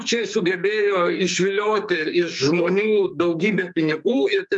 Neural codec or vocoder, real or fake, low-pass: codec, 44.1 kHz, 2.6 kbps, SNAC; fake; 10.8 kHz